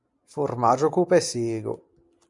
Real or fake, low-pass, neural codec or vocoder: real; 10.8 kHz; none